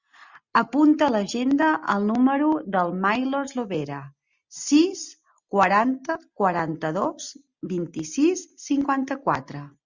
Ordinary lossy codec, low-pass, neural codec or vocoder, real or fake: Opus, 64 kbps; 7.2 kHz; none; real